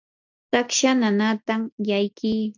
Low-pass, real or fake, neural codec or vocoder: 7.2 kHz; real; none